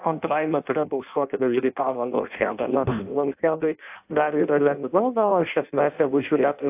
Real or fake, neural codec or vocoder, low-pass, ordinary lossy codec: fake; codec, 16 kHz in and 24 kHz out, 0.6 kbps, FireRedTTS-2 codec; 3.6 kHz; AAC, 32 kbps